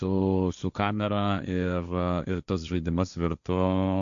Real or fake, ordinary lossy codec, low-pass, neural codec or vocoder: fake; MP3, 96 kbps; 7.2 kHz; codec, 16 kHz, 1.1 kbps, Voila-Tokenizer